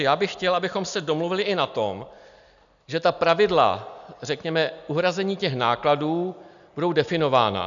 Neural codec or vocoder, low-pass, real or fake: none; 7.2 kHz; real